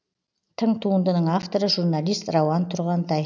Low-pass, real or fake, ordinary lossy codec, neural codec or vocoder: 7.2 kHz; real; none; none